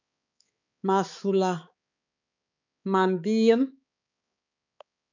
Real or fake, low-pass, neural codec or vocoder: fake; 7.2 kHz; codec, 16 kHz, 4 kbps, X-Codec, HuBERT features, trained on balanced general audio